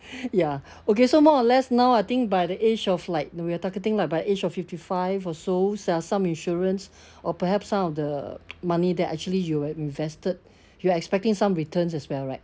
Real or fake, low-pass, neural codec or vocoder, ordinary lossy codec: real; none; none; none